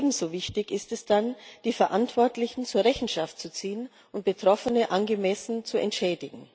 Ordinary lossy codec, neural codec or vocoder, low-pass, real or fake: none; none; none; real